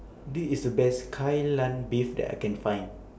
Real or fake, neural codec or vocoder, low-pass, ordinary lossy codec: real; none; none; none